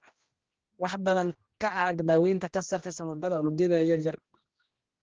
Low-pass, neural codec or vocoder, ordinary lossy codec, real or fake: 7.2 kHz; codec, 16 kHz, 1 kbps, X-Codec, HuBERT features, trained on general audio; Opus, 16 kbps; fake